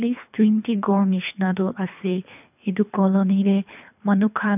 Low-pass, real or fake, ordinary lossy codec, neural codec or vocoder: 3.6 kHz; fake; none; codec, 24 kHz, 3 kbps, HILCodec